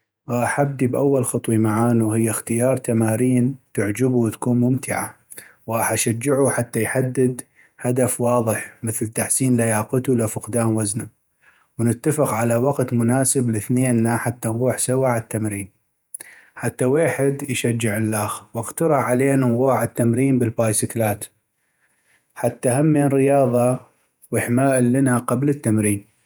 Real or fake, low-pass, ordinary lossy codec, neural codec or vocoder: fake; none; none; vocoder, 48 kHz, 128 mel bands, Vocos